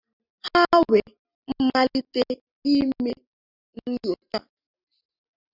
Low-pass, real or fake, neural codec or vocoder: 5.4 kHz; real; none